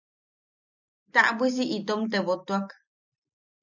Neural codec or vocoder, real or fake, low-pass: none; real; 7.2 kHz